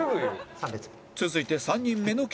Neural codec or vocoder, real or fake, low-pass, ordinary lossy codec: none; real; none; none